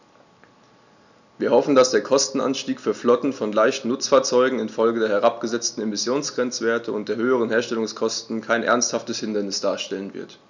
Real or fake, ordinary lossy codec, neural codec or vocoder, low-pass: real; none; none; 7.2 kHz